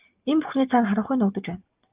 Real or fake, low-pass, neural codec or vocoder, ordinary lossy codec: real; 3.6 kHz; none; Opus, 24 kbps